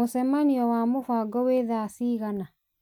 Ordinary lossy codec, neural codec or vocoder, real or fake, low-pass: none; none; real; 19.8 kHz